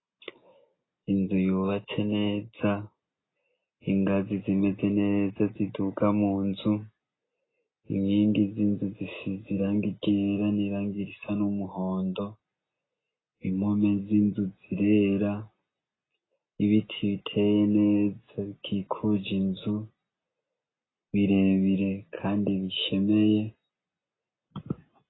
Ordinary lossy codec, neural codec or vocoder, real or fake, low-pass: AAC, 16 kbps; none; real; 7.2 kHz